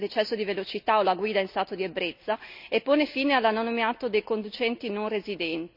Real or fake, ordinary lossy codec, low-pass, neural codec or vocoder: real; none; 5.4 kHz; none